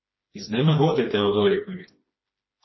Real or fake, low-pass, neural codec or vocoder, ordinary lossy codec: fake; 7.2 kHz; codec, 16 kHz, 2 kbps, FreqCodec, smaller model; MP3, 24 kbps